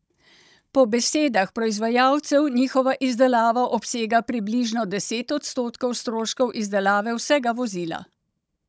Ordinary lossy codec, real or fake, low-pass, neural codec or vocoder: none; fake; none; codec, 16 kHz, 16 kbps, FunCodec, trained on Chinese and English, 50 frames a second